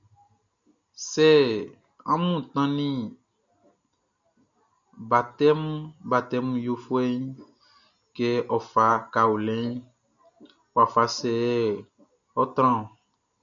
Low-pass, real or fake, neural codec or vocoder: 7.2 kHz; real; none